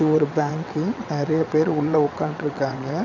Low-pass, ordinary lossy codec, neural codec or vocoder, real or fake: 7.2 kHz; none; vocoder, 22.05 kHz, 80 mel bands, WaveNeXt; fake